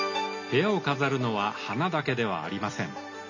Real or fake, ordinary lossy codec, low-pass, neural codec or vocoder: real; none; 7.2 kHz; none